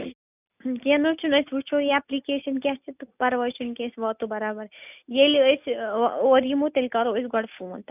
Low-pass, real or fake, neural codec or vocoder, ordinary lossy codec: 3.6 kHz; real; none; none